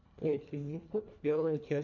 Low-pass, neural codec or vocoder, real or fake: 7.2 kHz; codec, 24 kHz, 1.5 kbps, HILCodec; fake